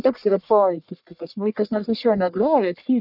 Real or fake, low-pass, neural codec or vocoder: fake; 5.4 kHz; codec, 44.1 kHz, 1.7 kbps, Pupu-Codec